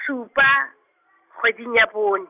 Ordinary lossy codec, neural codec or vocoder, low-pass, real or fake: none; none; 3.6 kHz; real